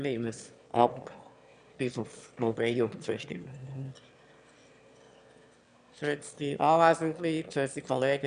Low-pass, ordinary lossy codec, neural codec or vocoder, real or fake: 9.9 kHz; none; autoencoder, 22.05 kHz, a latent of 192 numbers a frame, VITS, trained on one speaker; fake